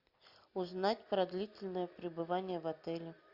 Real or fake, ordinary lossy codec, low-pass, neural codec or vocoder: fake; Opus, 64 kbps; 5.4 kHz; vocoder, 44.1 kHz, 128 mel bands every 256 samples, BigVGAN v2